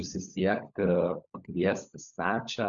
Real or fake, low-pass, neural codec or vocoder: fake; 7.2 kHz; codec, 16 kHz, 16 kbps, FunCodec, trained on LibriTTS, 50 frames a second